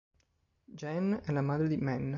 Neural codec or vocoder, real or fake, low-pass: none; real; 7.2 kHz